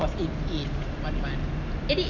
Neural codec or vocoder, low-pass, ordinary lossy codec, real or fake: none; 7.2 kHz; none; real